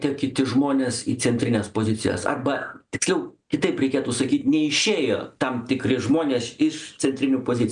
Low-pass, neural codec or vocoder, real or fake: 9.9 kHz; none; real